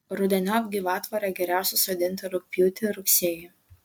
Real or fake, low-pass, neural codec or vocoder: real; 19.8 kHz; none